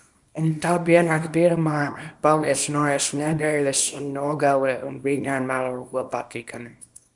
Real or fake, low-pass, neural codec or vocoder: fake; 10.8 kHz; codec, 24 kHz, 0.9 kbps, WavTokenizer, small release